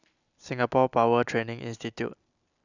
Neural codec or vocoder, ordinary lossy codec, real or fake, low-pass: none; none; real; 7.2 kHz